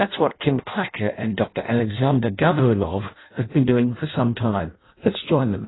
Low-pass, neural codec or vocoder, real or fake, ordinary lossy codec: 7.2 kHz; codec, 16 kHz in and 24 kHz out, 0.6 kbps, FireRedTTS-2 codec; fake; AAC, 16 kbps